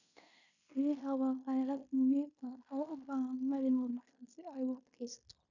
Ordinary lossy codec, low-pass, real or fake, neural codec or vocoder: none; 7.2 kHz; fake; codec, 16 kHz in and 24 kHz out, 0.9 kbps, LongCat-Audio-Codec, fine tuned four codebook decoder